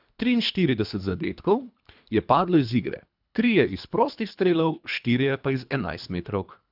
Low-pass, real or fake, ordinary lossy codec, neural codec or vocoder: 5.4 kHz; fake; none; codec, 24 kHz, 3 kbps, HILCodec